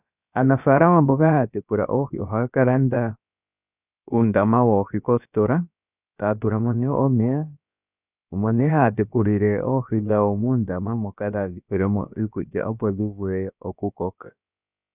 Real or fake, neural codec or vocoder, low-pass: fake; codec, 16 kHz, about 1 kbps, DyCAST, with the encoder's durations; 3.6 kHz